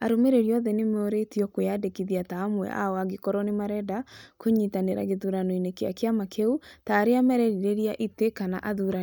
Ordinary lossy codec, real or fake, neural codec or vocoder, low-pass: none; real; none; none